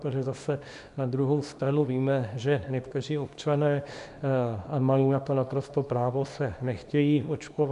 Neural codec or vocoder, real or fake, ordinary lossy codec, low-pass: codec, 24 kHz, 0.9 kbps, WavTokenizer, small release; fake; MP3, 96 kbps; 10.8 kHz